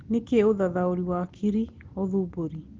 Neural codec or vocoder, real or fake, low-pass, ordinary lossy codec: none; real; 7.2 kHz; Opus, 16 kbps